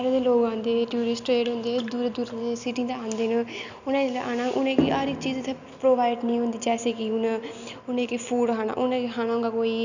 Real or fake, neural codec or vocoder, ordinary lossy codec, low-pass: real; none; none; 7.2 kHz